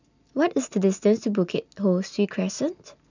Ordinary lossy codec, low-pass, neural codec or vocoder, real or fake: none; 7.2 kHz; none; real